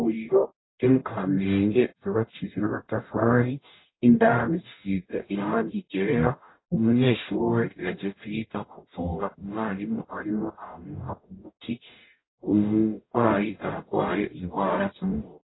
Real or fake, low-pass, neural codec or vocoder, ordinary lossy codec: fake; 7.2 kHz; codec, 44.1 kHz, 0.9 kbps, DAC; AAC, 16 kbps